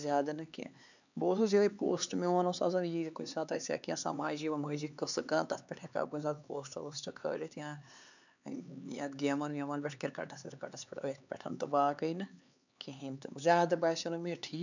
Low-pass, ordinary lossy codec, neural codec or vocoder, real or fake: 7.2 kHz; none; codec, 16 kHz, 4 kbps, X-Codec, HuBERT features, trained on LibriSpeech; fake